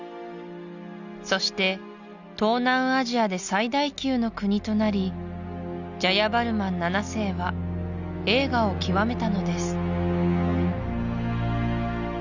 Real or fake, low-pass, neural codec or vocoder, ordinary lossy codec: real; 7.2 kHz; none; none